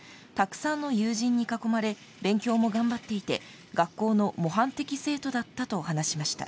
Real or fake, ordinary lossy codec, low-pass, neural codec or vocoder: real; none; none; none